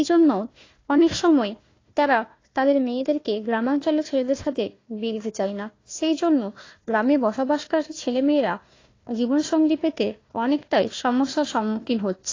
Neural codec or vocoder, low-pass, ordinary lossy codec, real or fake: codec, 16 kHz, 1 kbps, FunCodec, trained on Chinese and English, 50 frames a second; 7.2 kHz; AAC, 32 kbps; fake